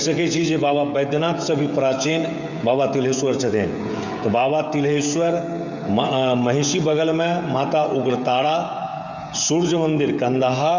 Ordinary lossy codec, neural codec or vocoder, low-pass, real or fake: none; codec, 16 kHz, 16 kbps, FreqCodec, smaller model; 7.2 kHz; fake